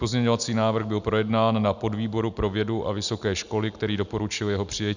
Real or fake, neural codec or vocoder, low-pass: real; none; 7.2 kHz